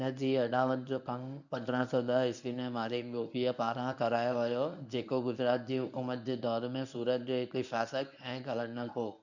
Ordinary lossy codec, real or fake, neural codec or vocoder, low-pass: none; fake; codec, 24 kHz, 0.9 kbps, WavTokenizer, medium speech release version 1; 7.2 kHz